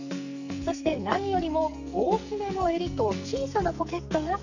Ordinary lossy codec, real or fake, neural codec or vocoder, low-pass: none; fake; codec, 44.1 kHz, 2.6 kbps, SNAC; 7.2 kHz